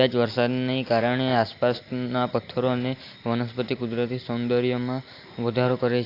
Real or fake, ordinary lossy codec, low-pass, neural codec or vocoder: real; AAC, 32 kbps; 5.4 kHz; none